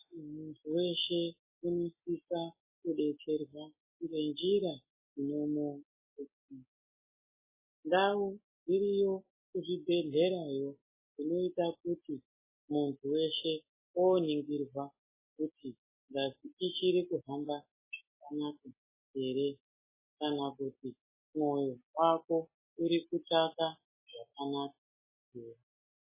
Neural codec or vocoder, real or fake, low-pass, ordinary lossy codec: none; real; 3.6 kHz; MP3, 16 kbps